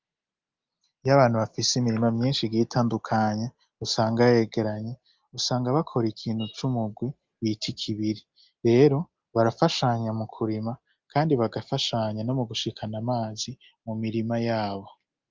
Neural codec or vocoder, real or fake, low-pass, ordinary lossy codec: none; real; 7.2 kHz; Opus, 24 kbps